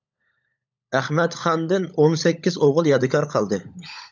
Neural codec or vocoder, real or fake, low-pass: codec, 16 kHz, 16 kbps, FunCodec, trained on LibriTTS, 50 frames a second; fake; 7.2 kHz